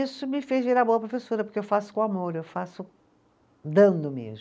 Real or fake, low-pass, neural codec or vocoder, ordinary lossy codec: real; none; none; none